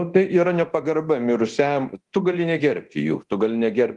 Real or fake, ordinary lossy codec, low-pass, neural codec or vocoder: fake; Opus, 24 kbps; 10.8 kHz; codec, 24 kHz, 0.9 kbps, DualCodec